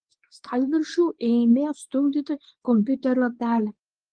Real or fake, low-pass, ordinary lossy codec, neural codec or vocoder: fake; 9.9 kHz; Opus, 24 kbps; codec, 24 kHz, 0.9 kbps, WavTokenizer, medium speech release version 2